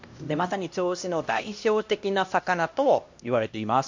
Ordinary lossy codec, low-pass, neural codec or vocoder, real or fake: MP3, 48 kbps; 7.2 kHz; codec, 16 kHz, 1 kbps, X-Codec, HuBERT features, trained on LibriSpeech; fake